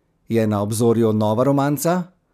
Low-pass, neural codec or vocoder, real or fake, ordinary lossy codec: 14.4 kHz; none; real; none